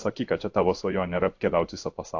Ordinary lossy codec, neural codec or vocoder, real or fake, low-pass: AAC, 48 kbps; codec, 16 kHz in and 24 kHz out, 1 kbps, XY-Tokenizer; fake; 7.2 kHz